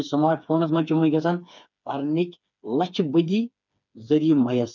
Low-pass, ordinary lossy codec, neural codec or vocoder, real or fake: 7.2 kHz; none; codec, 16 kHz, 4 kbps, FreqCodec, smaller model; fake